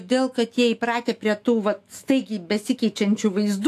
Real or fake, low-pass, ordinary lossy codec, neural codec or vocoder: fake; 14.4 kHz; AAC, 64 kbps; autoencoder, 48 kHz, 128 numbers a frame, DAC-VAE, trained on Japanese speech